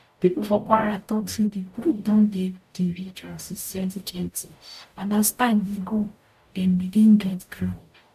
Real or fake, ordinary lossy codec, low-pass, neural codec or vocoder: fake; none; 14.4 kHz; codec, 44.1 kHz, 0.9 kbps, DAC